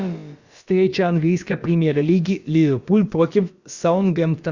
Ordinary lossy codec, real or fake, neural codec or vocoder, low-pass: Opus, 64 kbps; fake; codec, 16 kHz, about 1 kbps, DyCAST, with the encoder's durations; 7.2 kHz